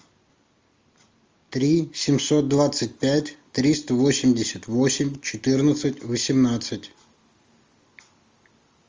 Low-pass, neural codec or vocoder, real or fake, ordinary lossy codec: 7.2 kHz; none; real; Opus, 32 kbps